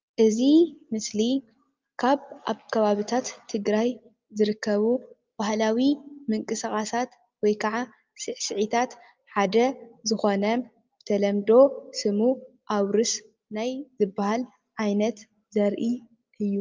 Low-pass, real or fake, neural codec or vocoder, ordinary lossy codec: 7.2 kHz; real; none; Opus, 24 kbps